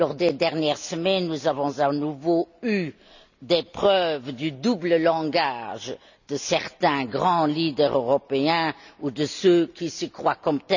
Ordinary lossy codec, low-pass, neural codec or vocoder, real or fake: none; 7.2 kHz; none; real